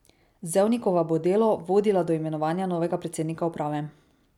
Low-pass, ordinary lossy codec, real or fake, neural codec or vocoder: 19.8 kHz; none; real; none